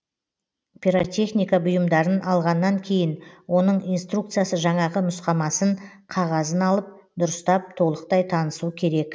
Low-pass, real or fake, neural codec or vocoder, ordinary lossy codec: none; real; none; none